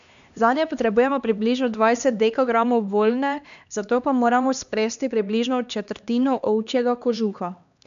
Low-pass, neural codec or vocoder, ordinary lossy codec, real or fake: 7.2 kHz; codec, 16 kHz, 2 kbps, X-Codec, HuBERT features, trained on LibriSpeech; none; fake